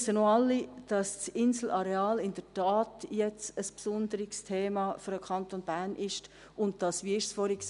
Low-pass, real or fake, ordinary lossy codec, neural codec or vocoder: 10.8 kHz; real; none; none